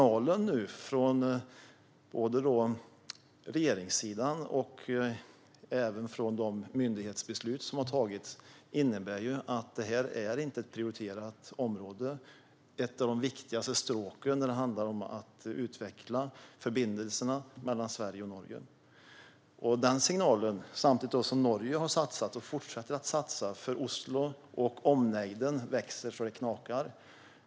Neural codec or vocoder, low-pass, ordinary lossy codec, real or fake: none; none; none; real